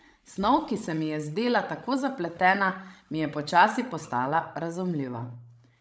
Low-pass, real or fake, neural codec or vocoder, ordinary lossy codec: none; fake; codec, 16 kHz, 16 kbps, FreqCodec, larger model; none